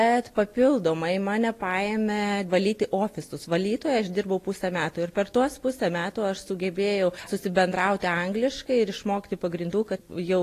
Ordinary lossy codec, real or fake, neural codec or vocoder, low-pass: AAC, 48 kbps; real; none; 14.4 kHz